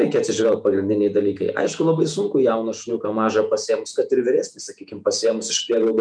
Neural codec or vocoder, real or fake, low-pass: vocoder, 48 kHz, 128 mel bands, Vocos; fake; 9.9 kHz